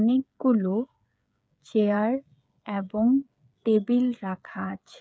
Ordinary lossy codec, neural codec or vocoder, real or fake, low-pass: none; codec, 16 kHz, 8 kbps, FreqCodec, smaller model; fake; none